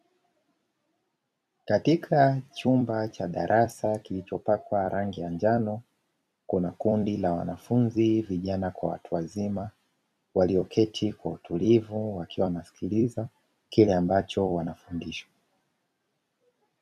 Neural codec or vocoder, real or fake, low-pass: vocoder, 44.1 kHz, 128 mel bands every 256 samples, BigVGAN v2; fake; 14.4 kHz